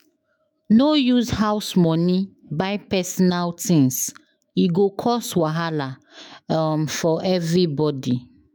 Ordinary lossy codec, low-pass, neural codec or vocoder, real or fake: none; none; autoencoder, 48 kHz, 128 numbers a frame, DAC-VAE, trained on Japanese speech; fake